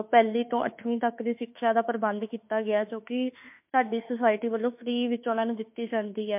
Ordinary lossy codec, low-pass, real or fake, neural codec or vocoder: MP3, 32 kbps; 3.6 kHz; fake; autoencoder, 48 kHz, 32 numbers a frame, DAC-VAE, trained on Japanese speech